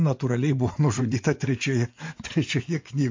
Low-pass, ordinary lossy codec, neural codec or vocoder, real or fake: 7.2 kHz; MP3, 48 kbps; vocoder, 24 kHz, 100 mel bands, Vocos; fake